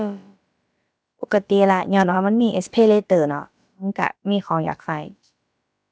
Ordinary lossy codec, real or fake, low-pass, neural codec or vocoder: none; fake; none; codec, 16 kHz, about 1 kbps, DyCAST, with the encoder's durations